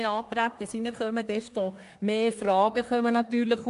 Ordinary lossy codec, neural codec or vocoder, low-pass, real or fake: AAC, 64 kbps; codec, 24 kHz, 1 kbps, SNAC; 10.8 kHz; fake